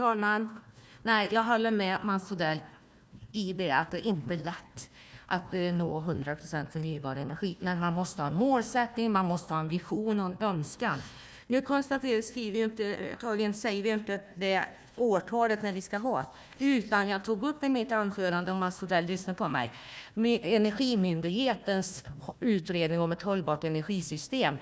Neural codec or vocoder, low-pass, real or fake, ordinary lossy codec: codec, 16 kHz, 1 kbps, FunCodec, trained on Chinese and English, 50 frames a second; none; fake; none